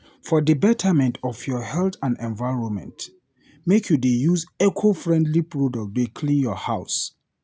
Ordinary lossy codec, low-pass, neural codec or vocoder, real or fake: none; none; none; real